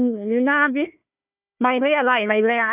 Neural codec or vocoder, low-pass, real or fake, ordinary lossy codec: codec, 16 kHz, 1 kbps, FunCodec, trained on Chinese and English, 50 frames a second; 3.6 kHz; fake; none